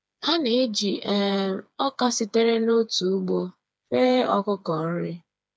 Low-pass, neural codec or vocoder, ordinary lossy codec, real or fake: none; codec, 16 kHz, 4 kbps, FreqCodec, smaller model; none; fake